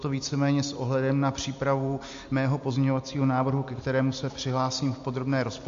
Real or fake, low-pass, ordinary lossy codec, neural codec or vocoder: real; 7.2 kHz; MP3, 48 kbps; none